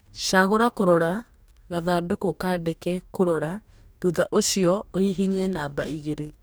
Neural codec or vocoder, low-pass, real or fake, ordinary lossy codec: codec, 44.1 kHz, 2.6 kbps, DAC; none; fake; none